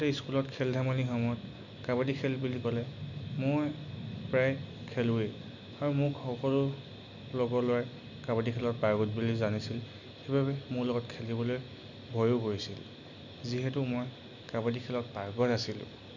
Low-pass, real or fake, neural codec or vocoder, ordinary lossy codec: 7.2 kHz; real; none; none